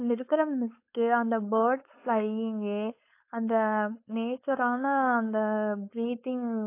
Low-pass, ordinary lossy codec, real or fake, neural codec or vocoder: 3.6 kHz; AAC, 24 kbps; fake; codec, 16 kHz, 4.8 kbps, FACodec